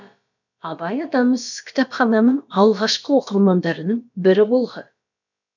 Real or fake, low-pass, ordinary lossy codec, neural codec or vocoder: fake; 7.2 kHz; none; codec, 16 kHz, about 1 kbps, DyCAST, with the encoder's durations